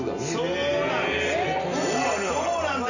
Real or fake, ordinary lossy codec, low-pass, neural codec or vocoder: real; none; 7.2 kHz; none